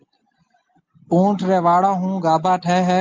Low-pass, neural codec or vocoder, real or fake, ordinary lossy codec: 7.2 kHz; none; real; Opus, 32 kbps